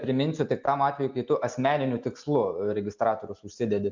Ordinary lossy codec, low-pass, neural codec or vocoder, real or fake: MP3, 64 kbps; 7.2 kHz; none; real